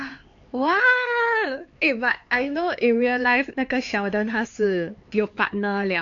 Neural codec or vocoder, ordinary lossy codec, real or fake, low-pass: codec, 16 kHz, 4 kbps, X-Codec, HuBERT features, trained on LibriSpeech; AAC, 48 kbps; fake; 7.2 kHz